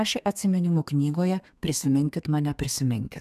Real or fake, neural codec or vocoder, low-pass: fake; codec, 32 kHz, 1.9 kbps, SNAC; 14.4 kHz